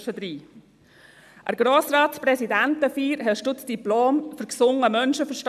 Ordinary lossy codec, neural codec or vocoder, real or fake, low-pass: none; none; real; 14.4 kHz